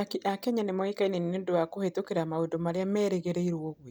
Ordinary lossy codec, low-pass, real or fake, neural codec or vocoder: none; none; real; none